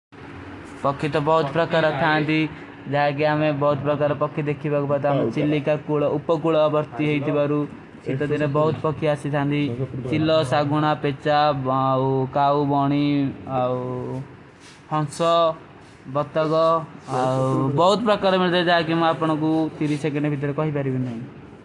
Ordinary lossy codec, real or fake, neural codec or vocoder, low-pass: AAC, 48 kbps; real; none; 10.8 kHz